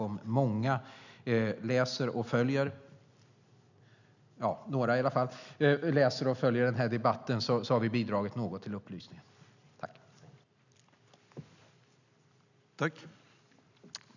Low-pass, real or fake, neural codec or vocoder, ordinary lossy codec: 7.2 kHz; real; none; none